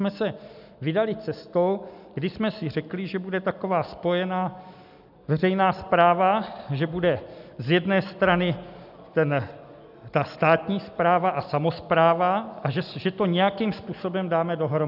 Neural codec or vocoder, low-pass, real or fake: none; 5.4 kHz; real